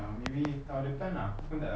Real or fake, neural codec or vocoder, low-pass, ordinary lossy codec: real; none; none; none